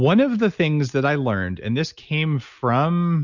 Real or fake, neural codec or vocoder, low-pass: real; none; 7.2 kHz